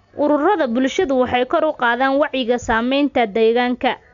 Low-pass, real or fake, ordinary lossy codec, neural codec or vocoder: 7.2 kHz; real; none; none